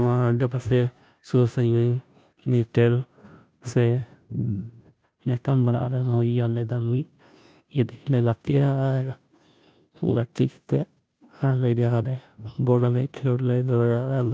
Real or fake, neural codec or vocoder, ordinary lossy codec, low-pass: fake; codec, 16 kHz, 0.5 kbps, FunCodec, trained on Chinese and English, 25 frames a second; none; none